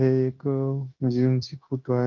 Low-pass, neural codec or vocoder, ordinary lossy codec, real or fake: 7.2 kHz; codec, 16 kHz, 0.9 kbps, LongCat-Audio-Codec; Opus, 24 kbps; fake